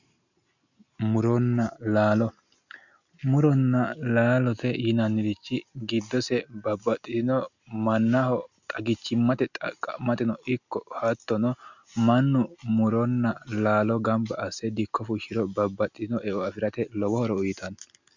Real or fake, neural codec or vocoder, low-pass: real; none; 7.2 kHz